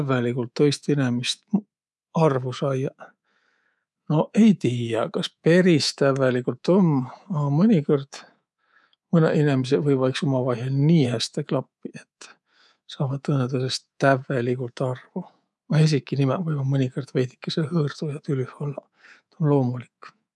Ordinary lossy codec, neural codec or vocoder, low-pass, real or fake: none; codec, 24 kHz, 3.1 kbps, DualCodec; none; fake